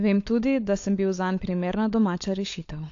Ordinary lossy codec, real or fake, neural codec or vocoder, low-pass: AAC, 48 kbps; fake; codec, 16 kHz, 16 kbps, FunCodec, trained on LibriTTS, 50 frames a second; 7.2 kHz